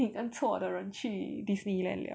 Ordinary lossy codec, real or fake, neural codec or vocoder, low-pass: none; real; none; none